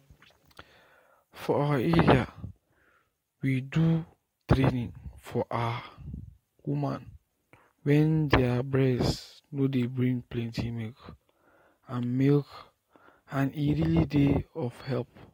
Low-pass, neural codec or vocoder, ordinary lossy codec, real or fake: 19.8 kHz; none; AAC, 48 kbps; real